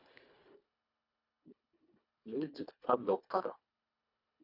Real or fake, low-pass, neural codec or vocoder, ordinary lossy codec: fake; 5.4 kHz; codec, 24 kHz, 1.5 kbps, HILCodec; none